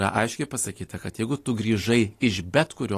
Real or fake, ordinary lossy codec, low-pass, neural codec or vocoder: real; AAC, 48 kbps; 14.4 kHz; none